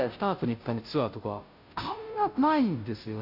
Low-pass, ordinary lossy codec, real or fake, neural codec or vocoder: 5.4 kHz; none; fake; codec, 16 kHz, 0.5 kbps, FunCodec, trained on Chinese and English, 25 frames a second